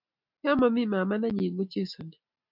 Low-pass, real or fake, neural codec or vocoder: 5.4 kHz; real; none